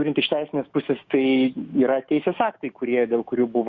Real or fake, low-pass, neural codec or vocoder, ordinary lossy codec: fake; 7.2 kHz; autoencoder, 48 kHz, 128 numbers a frame, DAC-VAE, trained on Japanese speech; Opus, 64 kbps